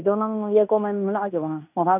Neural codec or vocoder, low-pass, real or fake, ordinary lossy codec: codec, 16 kHz in and 24 kHz out, 0.9 kbps, LongCat-Audio-Codec, fine tuned four codebook decoder; 3.6 kHz; fake; none